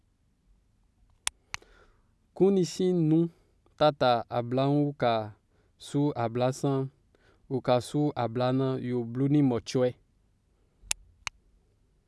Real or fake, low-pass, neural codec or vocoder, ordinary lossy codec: real; none; none; none